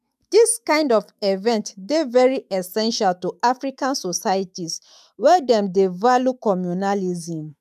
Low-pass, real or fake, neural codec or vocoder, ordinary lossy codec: 14.4 kHz; fake; autoencoder, 48 kHz, 128 numbers a frame, DAC-VAE, trained on Japanese speech; none